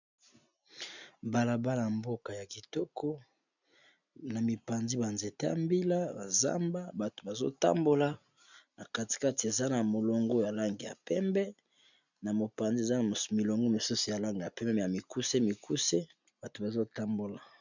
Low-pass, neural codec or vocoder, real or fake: 7.2 kHz; none; real